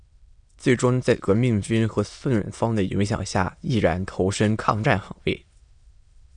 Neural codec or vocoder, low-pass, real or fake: autoencoder, 22.05 kHz, a latent of 192 numbers a frame, VITS, trained on many speakers; 9.9 kHz; fake